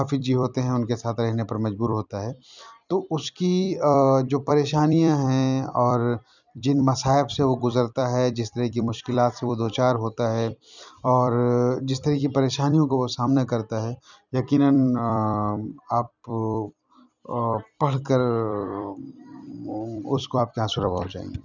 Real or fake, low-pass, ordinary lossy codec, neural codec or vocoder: fake; 7.2 kHz; none; vocoder, 44.1 kHz, 128 mel bands every 256 samples, BigVGAN v2